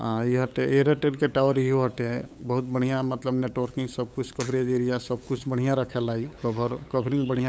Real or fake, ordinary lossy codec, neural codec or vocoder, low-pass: fake; none; codec, 16 kHz, 8 kbps, FunCodec, trained on LibriTTS, 25 frames a second; none